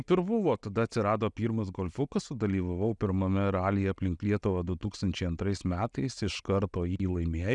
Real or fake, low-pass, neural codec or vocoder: real; 10.8 kHz; none